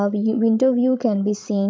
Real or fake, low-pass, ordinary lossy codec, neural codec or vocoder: fake; none; none; codec, 16 kHz, 16 kbps, FreqCodec, larger model